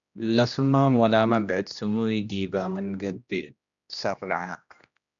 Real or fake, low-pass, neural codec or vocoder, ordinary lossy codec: fake; 7.2 kHz; codec, 16 kHz, 1 kbps, X-Codec, HuBERT features, trained on general audio; MP3, 96 kbps